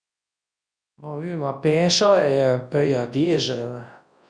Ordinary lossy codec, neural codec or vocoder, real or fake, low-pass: MP3, 64 kbps; codec, 24 kHz, 0.9 kbps, WavTokenizer, large speech release; fake; 9.9 kHz